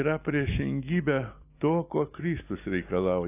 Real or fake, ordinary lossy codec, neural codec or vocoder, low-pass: real; AAC, 24 kbps; none; 3.6 kHz